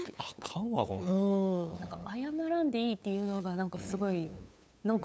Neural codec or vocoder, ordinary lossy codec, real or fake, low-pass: codec, 16 kHz, 8 kbps, FunCodec, trained on LibriTTS, 25 frames a second; none; fake; none